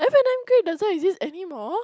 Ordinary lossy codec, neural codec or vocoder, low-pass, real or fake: none; none; none; real